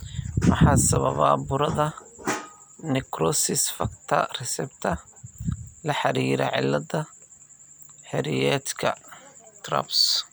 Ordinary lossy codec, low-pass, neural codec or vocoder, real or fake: none; none; none; real